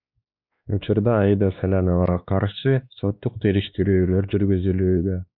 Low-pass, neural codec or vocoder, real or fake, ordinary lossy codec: 5.4 kHz; codec, 16 kHz, 2 kbps, X-Codec, WavLM features, trained on Multilingual LibriSpeech; fake; Opus, 64 kbps